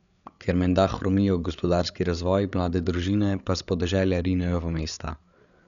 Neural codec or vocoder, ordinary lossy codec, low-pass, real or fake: codec, 16 kHz, 8 kbps, FreqCodec, larger model; none; 7.2 kHz; fake